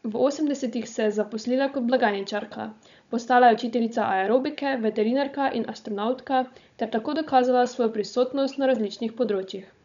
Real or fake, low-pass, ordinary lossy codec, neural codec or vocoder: fake; 7.2 kHz; none; codec, 16 kHz, 16 kbps, FunCodec, trained on Chinese and English, 50 frames a second